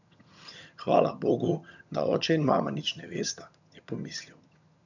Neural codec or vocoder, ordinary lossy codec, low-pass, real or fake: vocoder, 22.05 kHz, 80 mel bands, HiFi-GAN; none; 7.2 kHz; fake